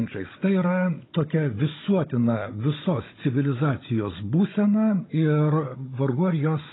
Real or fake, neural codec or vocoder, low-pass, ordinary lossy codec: fake; codec, 16 kHz, 16 kbps, FunCodec, trained on Chinese and English, 50 frames a second; 7.2 kHz; AAC, 16 kbps